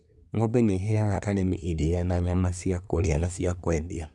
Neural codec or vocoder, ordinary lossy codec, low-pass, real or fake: codec, 24 kHz, 1 kbps, SNAC; none; 10.8 kHz; fake